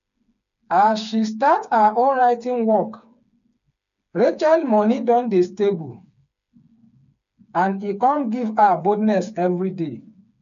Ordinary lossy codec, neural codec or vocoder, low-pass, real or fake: none; codec, 16 kHz, 4 kbps, FreqCodec, smaller model; 7.2 kHz; fake